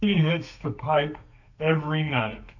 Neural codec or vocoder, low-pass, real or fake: codec, 32 kHz, 1.9 kbps, SNAC; 7.2 kHz; fake